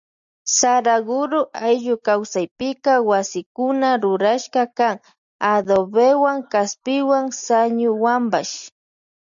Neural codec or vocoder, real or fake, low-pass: none; real; 7.2 kHz